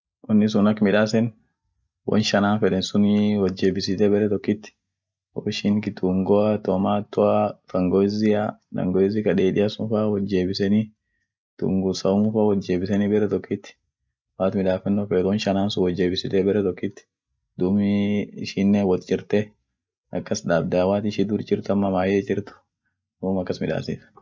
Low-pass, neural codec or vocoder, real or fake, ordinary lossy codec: none; none; real; none